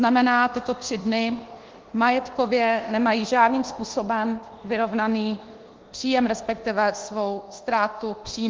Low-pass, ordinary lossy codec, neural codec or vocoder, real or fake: 7.2 kHz; Opus, 16 kbps; codec, 24 kHz, 1.2 kbps, DualCodec; fake